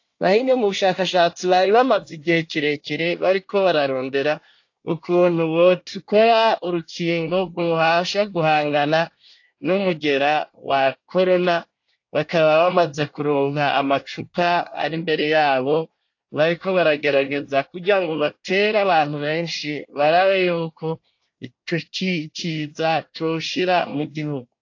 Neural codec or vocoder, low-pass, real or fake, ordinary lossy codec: codec, 24 kHz, 1 kbps, SNAC; 7.2 kHz; fake; AAC, 48 kbps